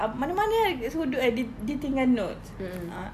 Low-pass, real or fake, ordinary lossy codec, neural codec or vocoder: 14.4 kHz; real; none; none